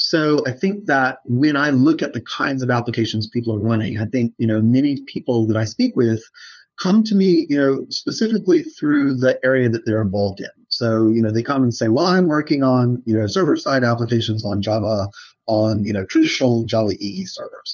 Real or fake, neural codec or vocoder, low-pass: fake; codec, 16 kHz, 2 kbps, FunCodec, trained on LibriTTS, 25 frames a second; 7.2 kHz